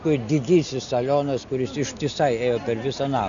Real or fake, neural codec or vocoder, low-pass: real; none; 7.2 kHz